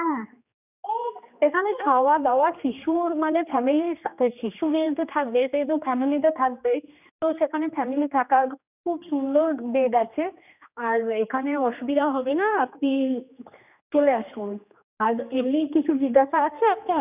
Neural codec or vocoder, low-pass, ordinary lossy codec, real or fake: codec, 16 kHz, 2 kbps, X-Codec, HuBERT features, trained on general audio; 3.6 kHz; none; fake